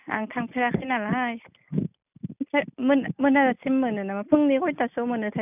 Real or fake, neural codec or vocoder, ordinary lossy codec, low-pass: real; none; none; 3.6 kHz